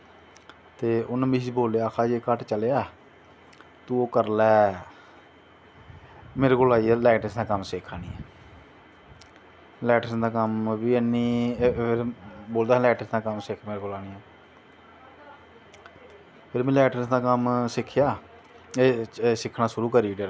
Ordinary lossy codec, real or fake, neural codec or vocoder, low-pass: none; real; none; none